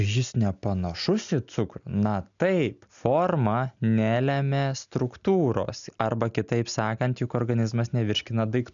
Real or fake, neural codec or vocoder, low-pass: real; none; 7.2 kHz